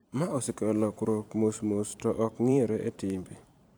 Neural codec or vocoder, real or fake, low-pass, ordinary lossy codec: vocoder, 44.1 kHz, 128 mel bands every 512 samples, BigVGAN v2; fake; none; none